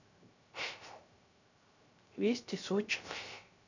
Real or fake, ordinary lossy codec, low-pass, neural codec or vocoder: fake; none; 7.2 kHz; codec, 16 kHz, 0.3 kbps, FocalCodec